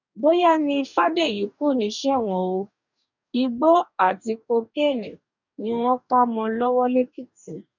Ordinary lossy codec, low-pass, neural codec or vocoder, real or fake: none; 7.2 kHz; codec, 44.1 kHz, 2.6 kbps, DAC; fake